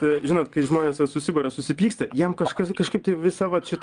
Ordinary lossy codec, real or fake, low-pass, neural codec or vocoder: Opus, 32 kbps; fake; 9.9 kHz; vocoder, 22.05 kHz, 80 mel bands, WaveNeXt